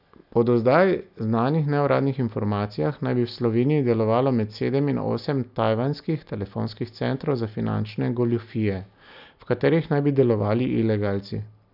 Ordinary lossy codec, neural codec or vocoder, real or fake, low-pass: none; none; real; 5.4 kHz